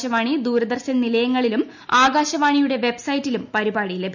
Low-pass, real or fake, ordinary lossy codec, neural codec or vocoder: 7.2 kHz; real; none; none